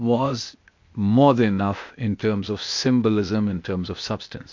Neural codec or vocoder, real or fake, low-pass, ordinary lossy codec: codec, 16 kHz, 0.8 kbps, ZipCodec; fake; 7.2 kHz; MP3, 48 kbps